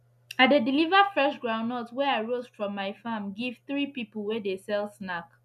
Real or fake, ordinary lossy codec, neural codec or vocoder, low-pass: real; none; none; 14.4 kHz